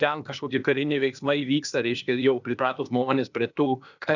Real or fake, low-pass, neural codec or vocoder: fake; 7.2 kHz; codec, 16 kHz, 0.8 kbps, ZipCodec